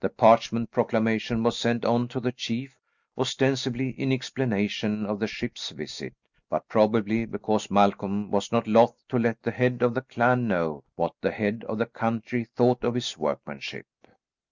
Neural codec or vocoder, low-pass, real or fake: none; 7.2 kHz; real